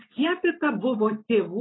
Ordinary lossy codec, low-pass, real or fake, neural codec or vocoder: AAC, 16 kbps; 7.2 kHz; real; none